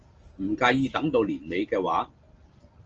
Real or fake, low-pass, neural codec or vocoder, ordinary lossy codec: real; 7.2 kHz; none; Opus, 24 kbps